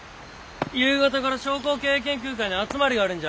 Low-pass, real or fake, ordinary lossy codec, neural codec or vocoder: none; real; none; none